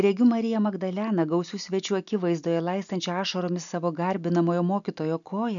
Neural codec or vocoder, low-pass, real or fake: none; 7.2 kHz; real